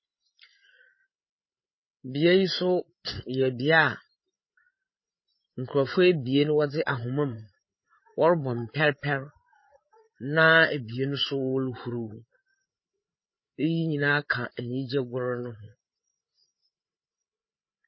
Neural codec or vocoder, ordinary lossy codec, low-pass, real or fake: codec, 16 kHz, 8 kbps, FreqCodec, larger model; MP3, 24 kbps; 7.2 kHz; fake